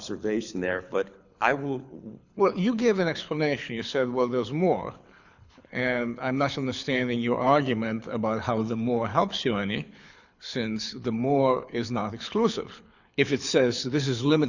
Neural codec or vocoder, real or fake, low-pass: codec, 24 kHz, 6 kbps, HILCodec; fake; 7.2 kHz